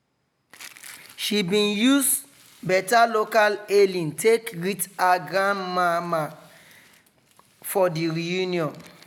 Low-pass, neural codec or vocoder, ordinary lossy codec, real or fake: none; none; none; real